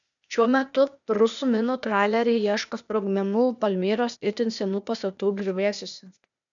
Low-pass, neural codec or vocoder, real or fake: 7.2 kHz; codec, 16 kHz, 0.8 kbps, ZipCodec; fake